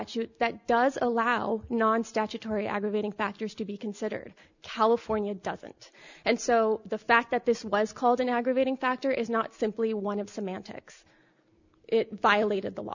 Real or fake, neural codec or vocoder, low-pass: real; none; 7.2 kHz